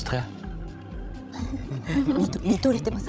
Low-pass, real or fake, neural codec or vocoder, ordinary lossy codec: none; fake; codec, 16 kHz, 16 kbps, FreqCodec, larger model; none